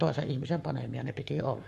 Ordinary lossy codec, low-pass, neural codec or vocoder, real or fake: MP3, 64 kbps; 14.4 kHz; codec, 44.1 kHz, 7.8 kbps, Pupu-Codec; fake